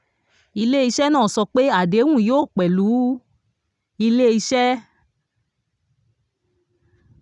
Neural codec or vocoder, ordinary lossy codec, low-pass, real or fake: none; none; 10.8 kHz; real